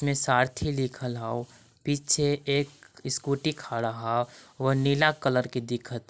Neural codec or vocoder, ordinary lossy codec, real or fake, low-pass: none; none; real; none